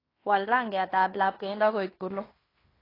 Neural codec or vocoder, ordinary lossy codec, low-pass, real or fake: codec, 16 kHz in and 24 kHz out, 0.9 kbps, LongCat-Audio-Codec, fine tuned four codebook decoder; AAC, 24 kbps; 5.4 kHz; fake